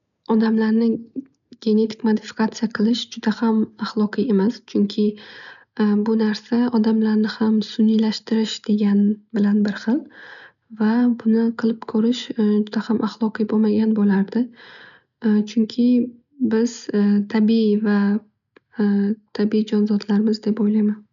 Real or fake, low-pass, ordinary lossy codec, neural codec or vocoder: real; 7.2 kHz; none; none